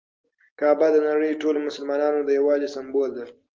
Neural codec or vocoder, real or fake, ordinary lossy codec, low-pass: none; real; Opus, 32 kbps; 7.2 kHz